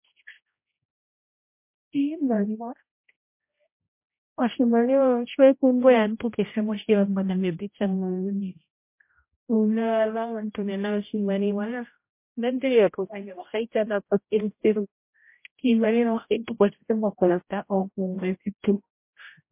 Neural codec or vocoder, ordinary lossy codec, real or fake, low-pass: codec, 16 kHz, 0.5 kbps, X-Codec, HuBERT features, trained on general audio; MP3, 24 kbps; fake; 3.6 kHz